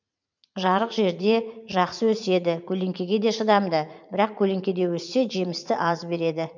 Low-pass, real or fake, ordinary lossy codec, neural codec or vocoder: 7.2 kHz; fake; none; vocoder, 44.1 kHz, 80 mel bands, Vocos